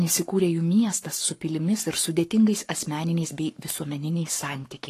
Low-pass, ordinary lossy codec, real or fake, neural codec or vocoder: 14.4 kHz; AAC, 48 kbps; fake; codec, 44.1 kHz, 7.8 kbps, Pupu-Codec